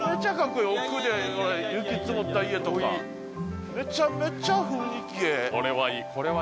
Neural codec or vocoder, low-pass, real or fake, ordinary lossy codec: none; none; real; none